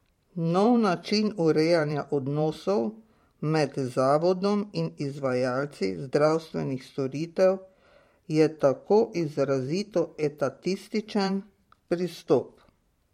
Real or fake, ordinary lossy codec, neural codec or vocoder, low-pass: fake; MP3, 64 kbps; vocoder, 44.1 kHz, 128 mel bands every 512 samples, BigVGAN v2; 19.8 kHz